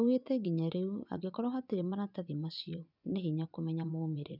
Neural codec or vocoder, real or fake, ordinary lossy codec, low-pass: vocoder, 24 kHz, 100 mel bands, Vocos; fake; none; 5.4 kHz